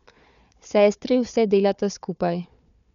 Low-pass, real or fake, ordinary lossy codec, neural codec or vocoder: 7.2 kHz; fake; none; codec, 16 kHz, 4 kbps, FunCodec, trained on Chinese and English, 50 frames a second